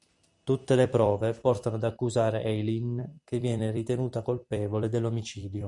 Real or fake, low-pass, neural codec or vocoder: fake; 10.8 kHz; vocoder, 44.1 kHz, 128 mel bands every 256 samples, BigVGAN v2